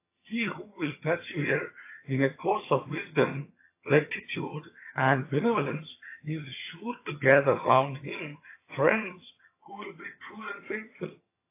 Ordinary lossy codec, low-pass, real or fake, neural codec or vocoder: AAC, 24 kbps; 3.6 kHz; fake; vocoder, 22.05 kHz, 80 mel bands, HiFi-GAN